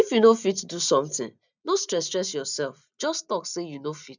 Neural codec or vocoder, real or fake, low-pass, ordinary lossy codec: none; real; 7.2 kHz; none